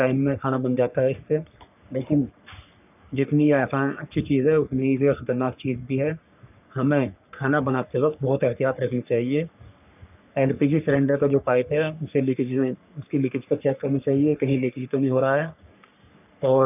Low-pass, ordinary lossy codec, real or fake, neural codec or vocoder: 3.6 kHz; none; fake; codec, 44.1 kHz, 3.4 kbps, Pupu-Codec